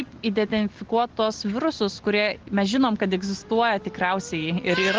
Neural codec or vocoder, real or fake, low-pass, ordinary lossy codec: none; real; 7.2 kHz; Opus, 16 kbps